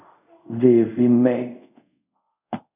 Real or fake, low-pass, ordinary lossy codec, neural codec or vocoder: fake; 3.6 kHz; AAC, 32 kbps; codec, 24 kHz, 0.5 kbps, DualCodec